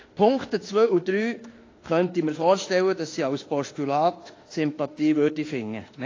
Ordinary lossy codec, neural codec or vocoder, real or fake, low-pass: AAC, 32 kbps; autoencoder, 48 kHz, 32 numbers a frame, DAC-VAE, trained on Japanese speech; fake; 7.2 kHz